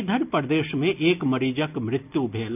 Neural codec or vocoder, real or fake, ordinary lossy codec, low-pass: none; real; none; 3.6 kHz